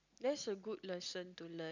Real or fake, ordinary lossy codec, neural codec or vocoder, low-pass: real; none; none; 7.2 kHz